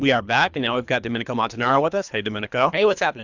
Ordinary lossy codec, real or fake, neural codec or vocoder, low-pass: Opus, 64 kbps; fake; codec, 24 kHz, 3 kbps, HILCodec; 7.2 kHz